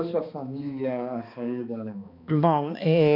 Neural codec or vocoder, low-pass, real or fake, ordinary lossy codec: codec, 16 kHz, 2 kbps, X-Codec, HuBERT features, trained on balanced general audio; 5.4 kHz; fake; none